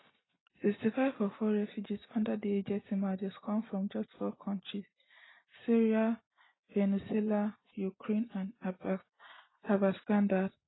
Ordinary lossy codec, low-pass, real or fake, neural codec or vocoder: AAC, 16 kbps; 7.2 kHz; real; none